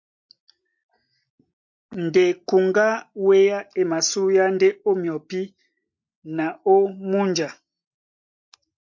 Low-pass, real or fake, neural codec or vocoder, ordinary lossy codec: 7.2 kHz; real; none; MP3, 64 kbps